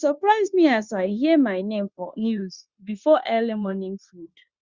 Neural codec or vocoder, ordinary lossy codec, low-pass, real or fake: codec, 16 kHz, 0.9 kbps, LongCat-Audio-Codec; Opus, 64 kbps; 7.2 kHz; fake